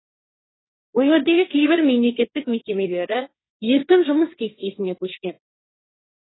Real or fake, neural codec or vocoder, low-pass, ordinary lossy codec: fake; codec, 16 kHz, 1.1 kbps, Voila-Tokenizer; 7.2 kHz; AAC, 16 kbps